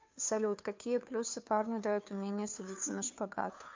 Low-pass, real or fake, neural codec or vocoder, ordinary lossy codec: 7.2 kHz; fake; codec, 16 kHz, 2 kbps, FunCodec, trained on Chinese and English, 25 frames a second; MP3, 64 kbps